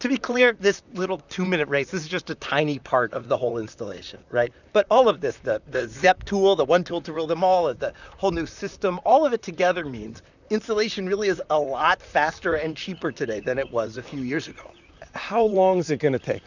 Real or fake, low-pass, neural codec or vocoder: fake; 7.2 kHz; vocoder, 44.1 kHz, 128 mel bands, Pupu-Vocoder